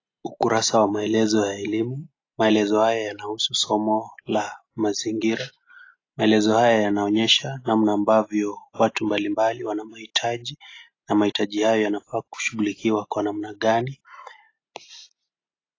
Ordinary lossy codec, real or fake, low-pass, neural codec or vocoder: AAC, 32 kbps; real; 7.2 kHz; none